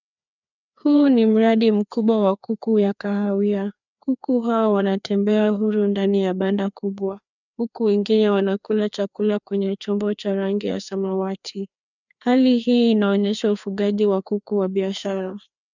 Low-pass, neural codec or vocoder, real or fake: 7.2 kHz; codec, 16 kHz, 2 kbps, FreqCodec, larger model; fake